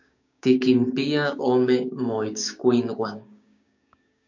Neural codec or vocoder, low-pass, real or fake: codec, 16 kHz, 6 kbps, DAC; 7.2 kHz; fake